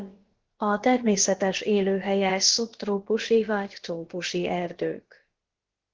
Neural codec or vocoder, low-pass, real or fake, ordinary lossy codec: codec, 16 kHz, about 1 kbps, DyCAST, with the encoder's durations; 7.2 kHz; fake; Opus, 16 kbps